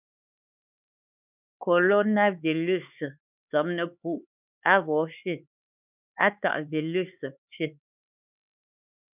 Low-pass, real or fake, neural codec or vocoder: 3.6 kHz; fake; codec, 16 kHz, 4 kbps, X-Codec, HuBERT features, trained on LibriSpeech